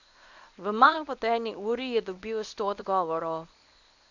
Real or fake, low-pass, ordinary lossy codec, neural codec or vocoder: fake; 7.2 kHz; none; codec, 24 kHz, 0.9 kbps, WavTokenizer, medium speech release version 1